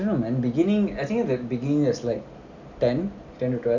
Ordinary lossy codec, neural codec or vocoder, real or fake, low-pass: none; none; real; 7.2 kHz